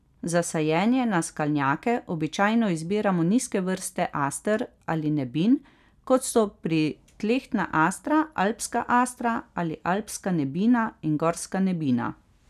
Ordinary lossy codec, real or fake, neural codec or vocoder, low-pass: none; real; none; 14.4 kHz